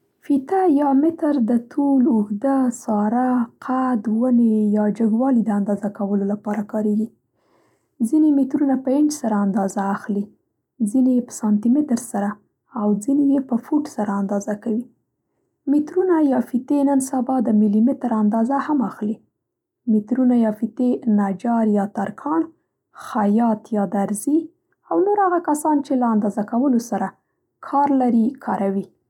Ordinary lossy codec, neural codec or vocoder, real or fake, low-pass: none; none; real; 19.8 kHz